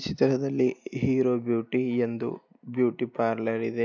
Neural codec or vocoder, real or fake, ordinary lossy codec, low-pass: none; real; none; 7.2 kHz